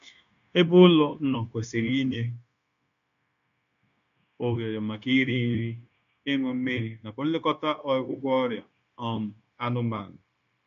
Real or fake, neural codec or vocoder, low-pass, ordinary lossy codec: fake; codec, 16 kHz, 0.9 kbps, LongCat-Audio-Codec; 7.2 kHz; none